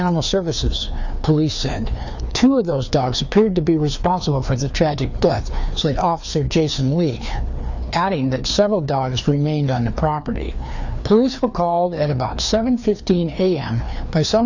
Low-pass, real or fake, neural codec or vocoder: 7.2 kHz; fake; codec, 16 kHz, 2 kbps, FreqCodec, larger model